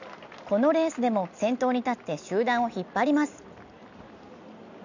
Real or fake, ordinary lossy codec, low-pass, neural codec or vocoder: real; none; 7.2 kHz; none